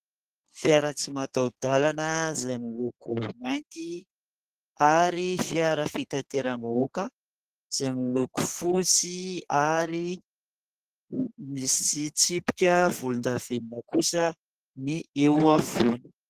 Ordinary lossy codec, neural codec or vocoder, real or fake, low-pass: Opus, 16 kbps; codec, 32 kHz, 1.9 kbps, SNAC; fake; 14.4 kHz